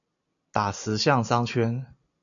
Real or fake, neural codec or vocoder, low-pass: real; none; 7.2 kHz